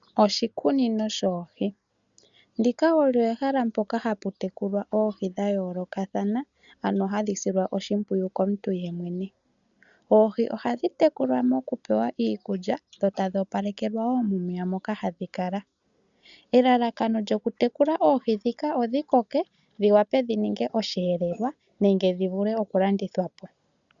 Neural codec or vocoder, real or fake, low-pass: none; real; 7.2 kHz